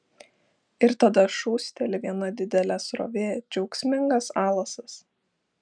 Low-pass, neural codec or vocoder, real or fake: 9.9 kHz; none; real